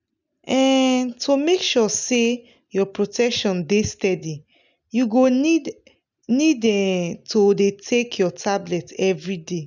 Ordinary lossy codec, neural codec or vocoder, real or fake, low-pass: none; none; real; 7.2 kHz